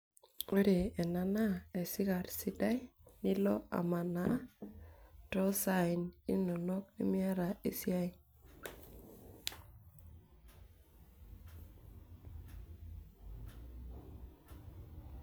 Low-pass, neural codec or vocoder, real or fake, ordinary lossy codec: none; none; real; none